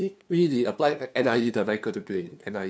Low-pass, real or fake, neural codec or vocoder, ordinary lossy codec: none; fake; codec, 16 kHz, 2 kbps, FunCodec, trained on LibriTTS, 25 frames a second; none